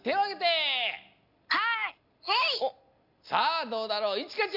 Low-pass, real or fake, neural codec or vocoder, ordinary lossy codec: 5.4 kHz; real; none; none